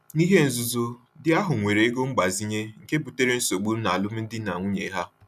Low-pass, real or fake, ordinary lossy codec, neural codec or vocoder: 14.4 kHz; real; none; none